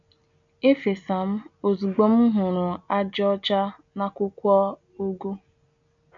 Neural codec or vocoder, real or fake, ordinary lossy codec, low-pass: none; real; none; 7.2 kHz